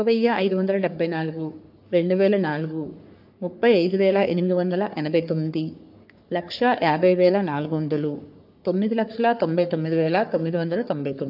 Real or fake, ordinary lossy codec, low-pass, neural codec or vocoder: fake; AAC, 48 kbps; 5.4 kHz; codec, 44.1 kHz, 3.4 kbps, Pupu-Codec